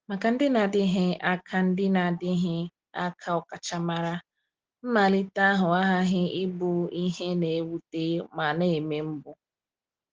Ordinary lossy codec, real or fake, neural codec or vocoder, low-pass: Opus, 16 kbps; real; none; 7.2 kHz